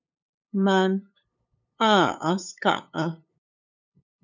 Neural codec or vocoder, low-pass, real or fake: codec, 16 kHz, 8 kbps, FunCodec, trained on LibriTTS, 25 frames a second; 7.2 kHz; fake